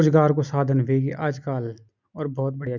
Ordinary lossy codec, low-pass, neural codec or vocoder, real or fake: none; 7.2 kHz; none; real